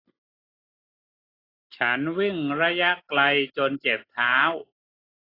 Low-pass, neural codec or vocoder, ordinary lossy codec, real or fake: 5.4 kHz; none; AAC, 24 kbps; real